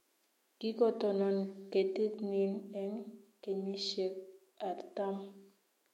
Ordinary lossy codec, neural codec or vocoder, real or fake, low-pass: MP3, 64 kbps; autoencoder, 48 kHz, 128 numbers a frame, DAC-VAE, trained on Japanese speech; fake; 19.8 kHz